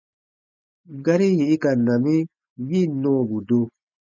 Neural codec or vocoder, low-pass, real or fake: none; 7.2 kHz; real